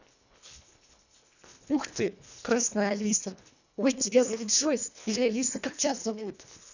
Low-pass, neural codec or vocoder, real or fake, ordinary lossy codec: 7.2 kHz; codec, 24 kHz, 1.5 kbps, HILCodec; fake; none